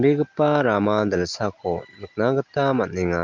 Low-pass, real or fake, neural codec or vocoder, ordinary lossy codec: 7.2 kHz; real; none; Opus, 32 kbps